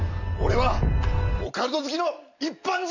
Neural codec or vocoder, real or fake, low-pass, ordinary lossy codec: none; real; 7.2 kHz; none